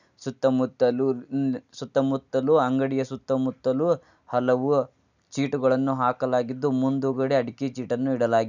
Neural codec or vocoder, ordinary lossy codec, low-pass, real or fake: none; none; 7.2 kHz; real